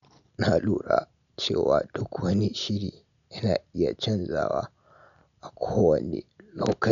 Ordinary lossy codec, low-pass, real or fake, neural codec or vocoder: none; 7.2 kHz; real; none